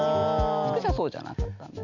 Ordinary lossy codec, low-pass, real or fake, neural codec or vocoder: none; 7.2 kHz; real; none